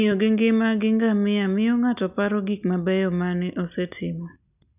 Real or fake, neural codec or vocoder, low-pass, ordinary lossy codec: real; none; 3.6 kHz; none